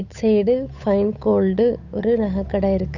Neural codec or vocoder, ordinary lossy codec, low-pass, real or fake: codec, 16 kHz, 8 kbps, FunCodec, trained on Chinese and English, 25 frames a second; none; 7.2 kHz; fake